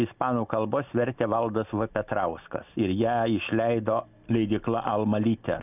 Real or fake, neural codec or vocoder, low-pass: real; none; 3.6 kHz